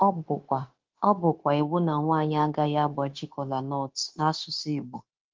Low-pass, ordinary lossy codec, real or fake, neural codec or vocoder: 7.2 kHz; Opus, 16 kbps; fake; codec, 16 kHz, 0.9 kbps, LongCat-Audio-Codec